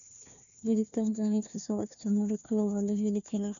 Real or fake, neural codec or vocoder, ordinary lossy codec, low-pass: fake; codec, 16 kHz, 1 kbps, FunCodec, trained on Chinese and English, 50 frames a second; none; 7.2 kHz